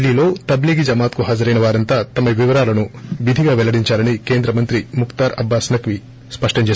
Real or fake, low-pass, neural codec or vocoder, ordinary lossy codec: real; none; none; none